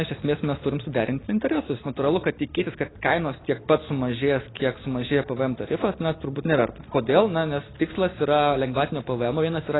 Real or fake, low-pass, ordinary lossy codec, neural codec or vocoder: real; 7.2 kHz; AAC, 16 kbps; none